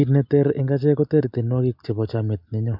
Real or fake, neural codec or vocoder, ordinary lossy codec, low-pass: real; none; MP3, 48 kbps; 5.4 kHz